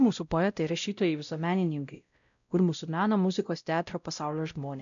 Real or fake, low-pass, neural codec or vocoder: fake; 7.2 kHz; codec, 16 kHz, 0.5 kbps, X-Codec, WavLM features, trained on Multilingual LibriSpeech